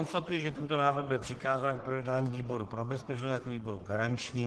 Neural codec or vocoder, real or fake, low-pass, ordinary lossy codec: codec, 44.1 kHz, 1.7 kbps, Pupu-Codec; fake; 10.8 kHz; Opus, 16 kbps